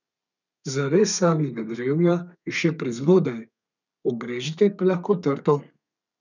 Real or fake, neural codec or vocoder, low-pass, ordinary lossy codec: fake; codec, 32 kHz, 1.9 kbps, SNAC; 7.2 kHz; none